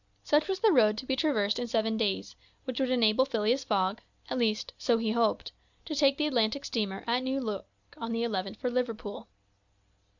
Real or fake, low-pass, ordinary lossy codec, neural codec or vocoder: real; 7.2 kHz; Opus, 64 kbps; none